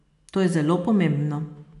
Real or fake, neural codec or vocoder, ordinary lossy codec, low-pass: real; none; AAC, 64 kbps; 10.8 kHz